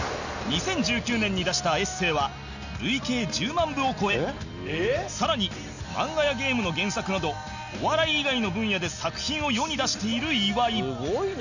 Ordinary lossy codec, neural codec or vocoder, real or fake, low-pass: none; none; real; 7.2 kHz